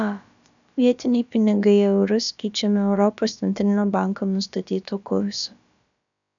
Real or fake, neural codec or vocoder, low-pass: fake; codec, 16 kHz, about 1 kbps, DyCAST, with the encoder's durations; 7.2 kHz